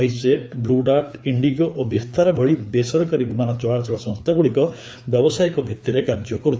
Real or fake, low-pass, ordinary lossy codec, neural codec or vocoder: fake; none; none; codec, 16 kHz, 4 kbps, FreqCodec, larger model